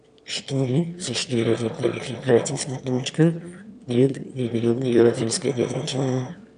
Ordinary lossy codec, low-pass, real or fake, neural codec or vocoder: none; 9.9 kHz; fake; autoencoder, 22.05 kHz, a latent of 192 numbers a frame, VITS, trained on one speaker